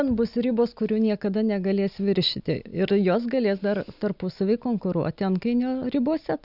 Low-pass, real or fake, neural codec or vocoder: 5.4 kHz; real; none